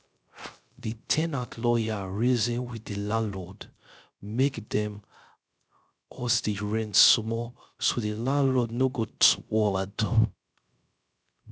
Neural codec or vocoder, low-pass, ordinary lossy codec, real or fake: codec, 16 kHz, 0.3 kbps, FocalCodec; none; none; fake